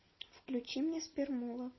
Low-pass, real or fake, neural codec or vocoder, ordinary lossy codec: 7.2 kHz; real; none; MP3, 24 kbps